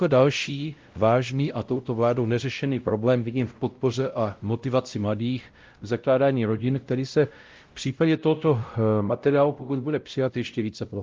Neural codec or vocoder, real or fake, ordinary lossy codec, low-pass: codec, 16 kHz, 0.5 kbps, X-Codec, WavLM features, trained on Multilingual LibriSpeech; fake; Opus, 32 kbps; 7.2 kHz